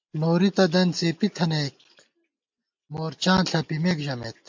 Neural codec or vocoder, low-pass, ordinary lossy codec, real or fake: none; 7.2 kHz; MP3, 64 kbps; real